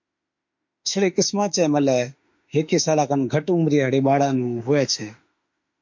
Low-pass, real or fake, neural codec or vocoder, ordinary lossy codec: 7.2 kHz; fake; autoencoder, 48 kHz, 32 numbers a frame, DAC-VAE, trained on Japanese speech; MP3, 48 kbps